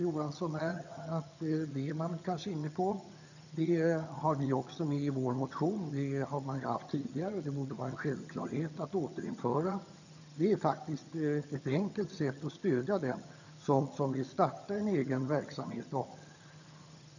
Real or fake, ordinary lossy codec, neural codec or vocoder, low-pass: fake; none; vocoder, 22.05 kHz, 80 mel bands, HiFi-GAN; 7.2 kHz